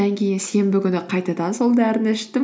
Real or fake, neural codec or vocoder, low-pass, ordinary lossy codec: real; none; none; none